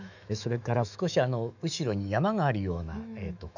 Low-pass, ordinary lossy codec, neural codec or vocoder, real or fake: 7.2 kHz; none; autoencoder, 48 kHz, 128 numbers a frame, DAC-VAE, trained on Japanese speech; fake